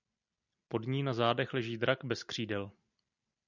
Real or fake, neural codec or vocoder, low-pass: real; none; 7.2 kHz